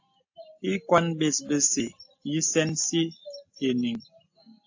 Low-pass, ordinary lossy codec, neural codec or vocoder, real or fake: 7.2 kHz; AAC, 48 kbps; none; real